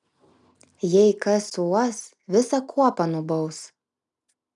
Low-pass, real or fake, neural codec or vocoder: 10.8 kHz; real; none